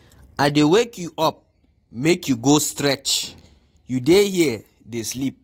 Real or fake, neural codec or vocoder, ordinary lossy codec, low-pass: real; none; AAC, 48 kbps; 19.8 kHz